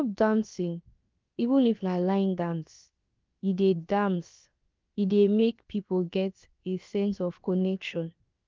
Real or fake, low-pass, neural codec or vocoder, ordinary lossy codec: fake; 7.2 kHz; codec, 16 kHz, about 1 kbps, DyCAST, with the encoder's durations; Opus, 32 kbps